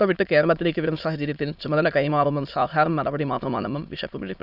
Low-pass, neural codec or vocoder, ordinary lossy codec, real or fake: 5.4 kHz; autoencoder, 22.05 kHz, a latent of 192 numbers a frame, VITS, trained on many speakers; none; fake